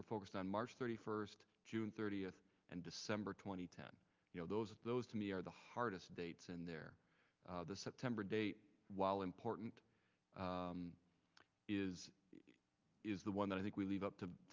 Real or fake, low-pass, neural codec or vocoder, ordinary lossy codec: real; 7.2 kHz; none; Opus, 24 kbps